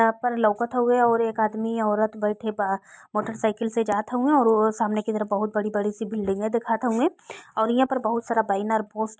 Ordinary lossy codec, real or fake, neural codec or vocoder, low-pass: none; real; none; none